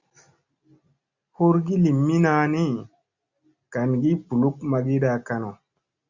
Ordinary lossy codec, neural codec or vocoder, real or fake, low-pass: Opus, 64 kbps; none; real; 7.2 kHz